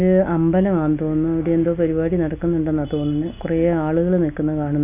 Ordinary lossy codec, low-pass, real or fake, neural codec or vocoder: none; 3.6 kHz; real; none